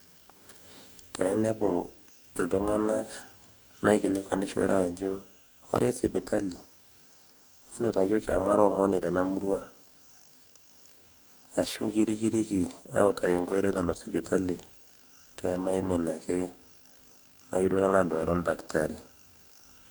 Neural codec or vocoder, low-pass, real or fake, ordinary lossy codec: codec, 44.1 kHz, 2.6 kbps, DAC; none; fake; none